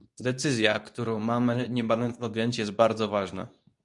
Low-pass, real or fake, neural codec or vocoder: 10.8 kHz; fake; codec, 24 kHz, 0.9 kbps, WavTokenizer, medium speech release version 2